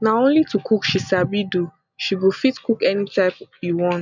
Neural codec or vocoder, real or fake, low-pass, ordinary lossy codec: none; real; 7.2 kHz; none